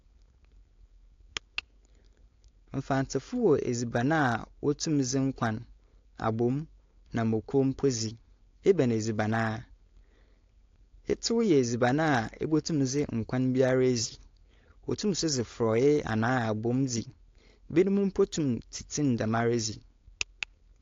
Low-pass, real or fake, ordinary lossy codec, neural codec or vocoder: 7.2 kHz; fake; AAC, 48 kbps; codec, 16 kHz, 4.8 kbps, FACodec